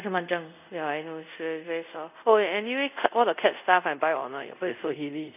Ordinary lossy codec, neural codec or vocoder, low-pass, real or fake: none; codec, 24 kHz, 0.5 kbps, DualCodec; 3.6 kHz; fake